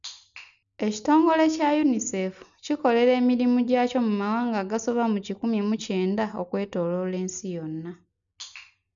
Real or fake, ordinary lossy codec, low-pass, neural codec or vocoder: real; none; 7.2 kHz; none